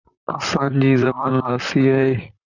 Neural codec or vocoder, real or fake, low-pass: vocoder, 44.1 kHz, 128 mel bands, Pupu-Vocoder; fake; 7.2 kHz